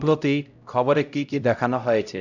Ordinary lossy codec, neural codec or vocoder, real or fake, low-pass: none; codec, 16 kHz, 0.5 kbps, X-Codec, HuBERT features, trained on LibriSpeech; fake; 7.2 kHz